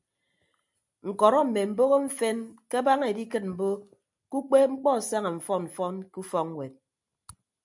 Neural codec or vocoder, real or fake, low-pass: none; real; 10.8 kHz